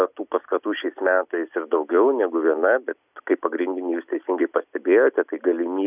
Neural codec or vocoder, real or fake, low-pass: none; real; 3.6 kHz